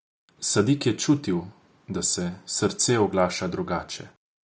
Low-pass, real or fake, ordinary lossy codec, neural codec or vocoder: none; real; none; none